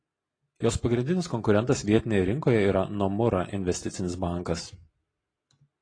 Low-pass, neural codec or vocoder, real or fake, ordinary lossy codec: 9.9 kHz; none; real; AAC, 32 kbps